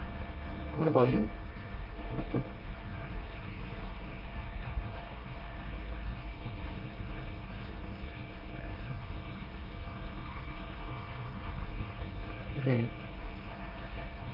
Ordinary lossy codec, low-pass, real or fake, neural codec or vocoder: Opus, 24 kbps; 5.4 kHz; fake; codec, 24 kHz, 1 kbps, SNAC